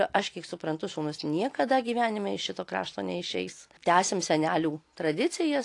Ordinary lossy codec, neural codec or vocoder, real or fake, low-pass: AAC, 48 kbps; none; real; 10.8 kHz